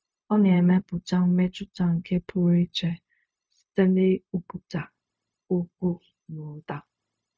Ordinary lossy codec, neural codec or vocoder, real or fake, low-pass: none; codec, 16 kHz, 0.4 kbps, LongCat-Audio-Codec; fake; none